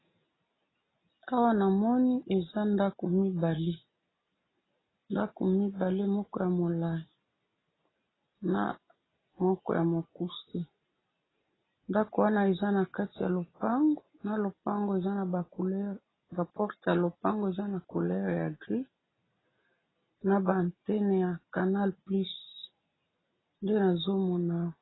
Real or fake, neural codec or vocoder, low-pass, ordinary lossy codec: real; none; 7.2 kHz; AAC, 16 kbps